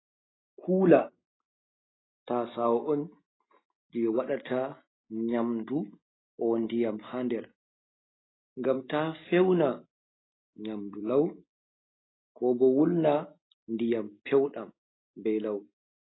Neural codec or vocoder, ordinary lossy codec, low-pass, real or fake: none; AAC, 16 kbps; 7.2 kHz; real